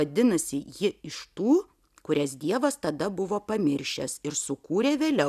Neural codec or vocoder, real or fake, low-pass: none; real; 14.4 kHz